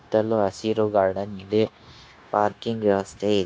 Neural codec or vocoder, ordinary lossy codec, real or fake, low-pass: codec, 16 kHz, 0.9 kbps, LongCat-Audio-Codec; none; fake; none